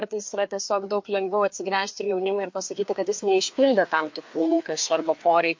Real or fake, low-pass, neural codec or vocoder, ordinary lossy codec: fake; 7.2 kHz; codec, 16 kHz, 2 kbps, FreqCodec, larger model; MP3, 48 kbps